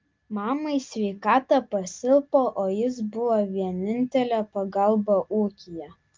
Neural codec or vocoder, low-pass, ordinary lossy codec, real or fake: none; 7.2 kHz; Opus, 32 kbps; real